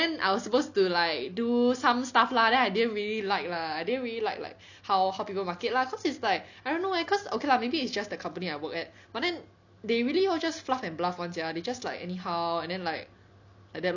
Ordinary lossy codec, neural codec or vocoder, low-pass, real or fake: none; none; 7.2 kHz; real